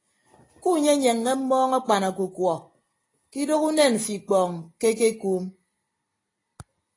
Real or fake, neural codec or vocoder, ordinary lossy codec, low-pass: real; none; AAC, 32 kbps; 10.8 kHz